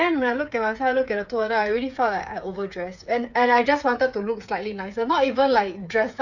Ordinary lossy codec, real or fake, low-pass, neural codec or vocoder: none; fake; 7.2 kHz; codec, 16 kHz, 8 kbps, FreqCodec, smaller model